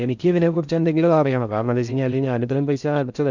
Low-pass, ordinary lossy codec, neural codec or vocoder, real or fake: 7.2 kHz; none; codec, 16 kHz in and 24 kHz out, 0.6 kbps, FocalCodec, streaming, 4096 codes; fake